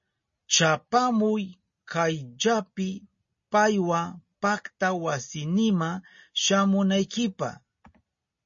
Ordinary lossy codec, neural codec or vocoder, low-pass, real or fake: MP3, 32 kbps; none; 7.2 kHz; real